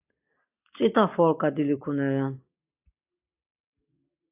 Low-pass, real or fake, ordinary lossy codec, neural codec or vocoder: 3.6 kHz; real; AAC, 32 kbps; none